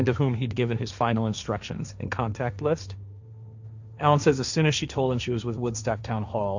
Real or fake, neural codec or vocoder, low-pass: fake; codec, 16 kHz, 1.1 kbps, Voila-Tokenizer; 7.2 kHz